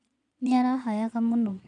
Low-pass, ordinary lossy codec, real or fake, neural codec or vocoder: 9.9 kHz; MP3, 64 kbps; fake; vocoder, 22.05 kHz, 80 mel bands, Vocos